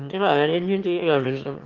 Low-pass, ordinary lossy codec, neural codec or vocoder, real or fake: 7.2 kHz; Opus, 32 kbps; autoencoder, 22.05 kHz, a latent of 192 numbers a frame, VITS, trained on one speaker; fake